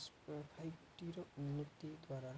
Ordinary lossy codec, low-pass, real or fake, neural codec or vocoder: none; none; real; none